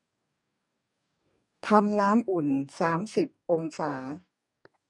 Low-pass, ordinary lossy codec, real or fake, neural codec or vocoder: 10.8 kHz; none; fake; codec, 44.1 kHz, 2.6 kbps, DAC